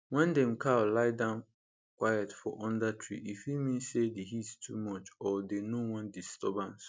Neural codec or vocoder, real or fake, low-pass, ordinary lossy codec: none; real; none; none